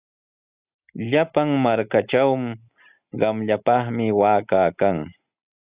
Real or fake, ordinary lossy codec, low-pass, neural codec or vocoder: real; Opus, 64 kbps; 3.6 kHz; none